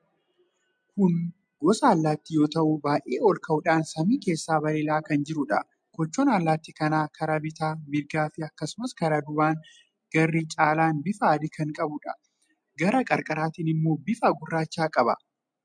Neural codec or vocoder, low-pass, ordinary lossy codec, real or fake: none; 9.9 kHz; MP3, 64 kbps; real